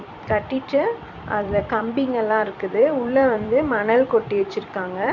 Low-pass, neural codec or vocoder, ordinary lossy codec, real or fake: 7.2 kHz; vocoder, 44.1 kHz, 128 mel bands every 256 samples, BigVGAN v2; none; fake